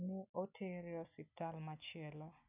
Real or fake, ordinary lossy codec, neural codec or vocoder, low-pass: real; none; none; 3.6 kHz